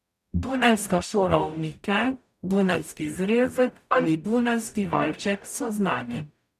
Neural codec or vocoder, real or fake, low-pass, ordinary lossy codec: codec, 44.1 kHz, 0.9 kbps, DAC; fake; 14.4 kHz; none